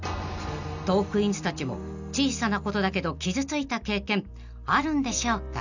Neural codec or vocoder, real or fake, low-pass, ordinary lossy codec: none; real; 7.2 kHz; none